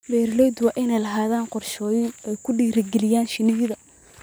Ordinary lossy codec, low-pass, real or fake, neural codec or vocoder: none; none; real; none